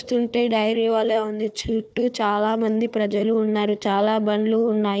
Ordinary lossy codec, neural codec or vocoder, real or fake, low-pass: none; codec, 16 kHz, 4 kbps, FunCodec, trained on Chinese and English, 50 frames a second; fake; none